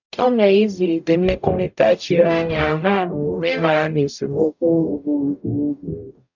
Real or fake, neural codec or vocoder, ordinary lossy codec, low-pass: fake; codec, 44.1 kHz, 0.9 kbps, DAC; none; 7.2 kHz